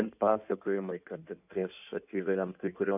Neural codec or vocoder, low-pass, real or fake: codec, 16 kHz in and 24 kHz out, 1.1 kbps, FireRedTTS-2 codec; 3.6 kHz; fake